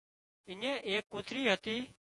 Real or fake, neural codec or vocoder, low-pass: fake; vocoder, 48 kHz, 128 mel bands, Vocos; 10.8 kHz